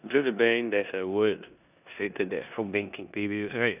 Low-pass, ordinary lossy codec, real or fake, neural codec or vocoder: 3.6 kHz; none; fake; codec, 16 kHz in and 24 kHz out, 0.9 kbps, LongCat-Audio-Codec, four codebook decoder